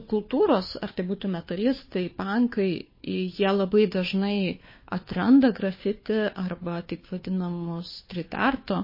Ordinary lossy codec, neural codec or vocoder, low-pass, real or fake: MP3, 24 kbps; codec, 16 kHz, 2 kbps, FunCodec, trained on Chinese and English, 25 frames a second; 5.4 kHz; fake